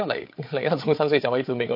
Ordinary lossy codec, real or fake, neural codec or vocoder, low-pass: MP3, 32 kbps; fake; codec, 16 kHz, 8 kbps, FreqCodec, larger model; 5.4 kHz